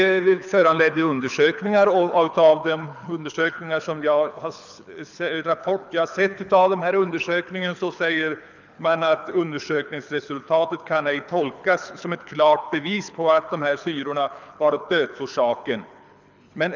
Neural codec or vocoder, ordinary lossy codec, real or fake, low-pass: codec, 24 kHz, 6 kbps, HILCodec; none; fake; 7.2 kHz